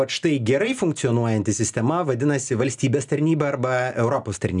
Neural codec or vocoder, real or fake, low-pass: none; real; 10.8 kHz